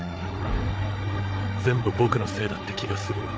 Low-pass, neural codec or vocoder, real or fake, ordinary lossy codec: none; codec, 16 kHz, 8 kbps, FreqCodec, larger model; fake; none